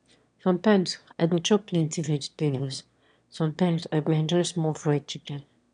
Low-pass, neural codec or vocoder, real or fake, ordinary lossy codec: 9.9 kHz; autoencoder, 22.05 kHz, a latent of 192 numbers a frame, VITS, trained on one speaker; fake; none